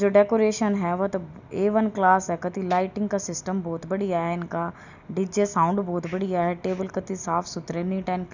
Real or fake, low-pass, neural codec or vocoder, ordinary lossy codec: real; 7.2 kHz; none; none